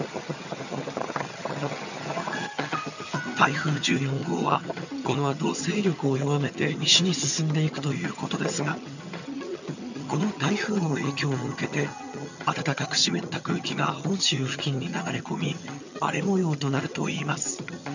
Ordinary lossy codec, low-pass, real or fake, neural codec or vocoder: none; 7.2 kHz; fake; vocoder, 22.05 kHz, 80 mel bands, HiFi-GAN